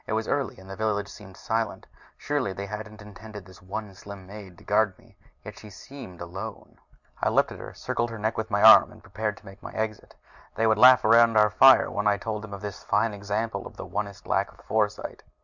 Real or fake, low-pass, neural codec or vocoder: real; 7.2 kHz; none